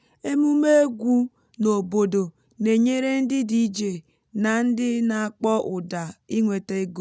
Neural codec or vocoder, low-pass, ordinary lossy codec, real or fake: none; none; none; real